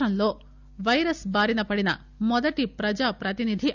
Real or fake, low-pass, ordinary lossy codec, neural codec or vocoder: real; 7.2 kHz; none; none